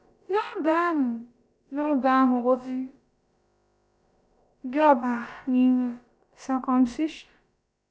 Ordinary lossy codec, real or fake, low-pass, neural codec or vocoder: none; fake; none; codec, 16 kHz, about 1 kbps, DyCAST, with the encoder's durations